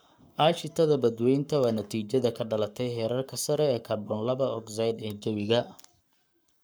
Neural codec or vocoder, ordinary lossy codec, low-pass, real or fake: codec, 44.1 kHz, 7.8 kbps, Pupu-Codec; none; none; fake